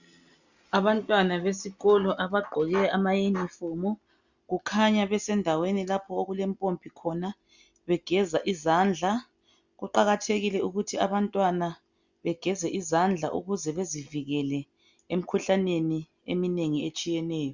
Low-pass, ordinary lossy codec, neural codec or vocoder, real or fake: 7.2 kHz; Opus, 64 kbps; none; real